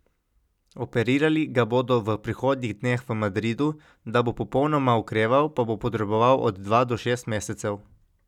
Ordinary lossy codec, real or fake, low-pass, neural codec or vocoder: none; real; 19.8 kHz; none